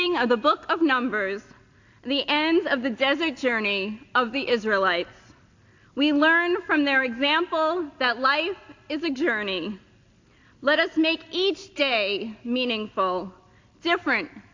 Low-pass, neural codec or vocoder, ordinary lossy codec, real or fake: 7.2 kHz; none; AAC, 48 kbps; real